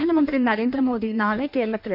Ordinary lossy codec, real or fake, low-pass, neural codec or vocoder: none; fake; 5.4 kHz; codec, 16 kHz in and 24 kHz out, 1.1 kbps, FireRedTTS-2 codec